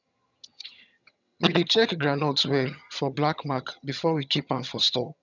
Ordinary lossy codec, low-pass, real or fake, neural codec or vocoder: none; 7.2 kHz; fake; vocoder, 22.05 kHz, 80 mel bands, HiFi-GAN